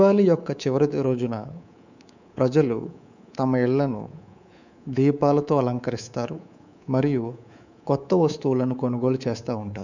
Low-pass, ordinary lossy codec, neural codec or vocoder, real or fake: 7.2 kHz; none; codec, 24 kHz, 3.1 kbps, DualCodec; fake